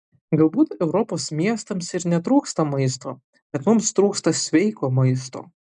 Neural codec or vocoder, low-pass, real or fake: none; 9.9 kHz; real